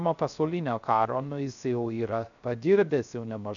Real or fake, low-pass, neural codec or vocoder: fake; 7.2 kHz; codec, 16 kHz, 0.3 kbps, FocalCodec